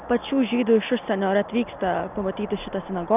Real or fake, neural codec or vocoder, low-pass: real; none; 3.6 kHz